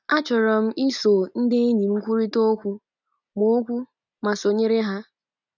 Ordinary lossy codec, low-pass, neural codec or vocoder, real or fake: none; 7.2 kHz; none; real